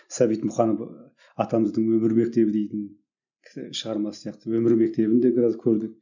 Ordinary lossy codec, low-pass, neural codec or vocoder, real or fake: none; 7.2 kHz; none; real